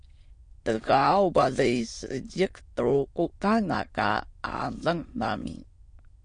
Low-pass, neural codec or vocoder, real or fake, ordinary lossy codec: 9.9 kHz; autoencoder, 22.05 kHz, a latent of 192 numbers a frame, VITS, trained on many speakers; fake; MP3, 48 kbps